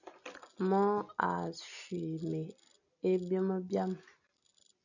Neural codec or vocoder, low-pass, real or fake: none; 7.2 kHz; real